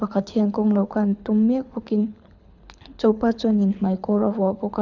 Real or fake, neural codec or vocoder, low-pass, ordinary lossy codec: fake; codec, 24 kHz, 6 kbps, HILCodec; 7.2 kHz; none